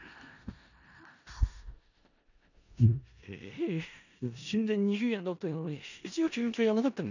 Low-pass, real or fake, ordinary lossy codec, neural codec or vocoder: 7.2 kHz; fake; none; codec, 16 kHz in and 24 kHz out, 0.4 kbps, LongCat-Audio-Codec, four codebook decoder